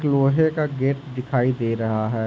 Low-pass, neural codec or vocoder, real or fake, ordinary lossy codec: none; none; real; none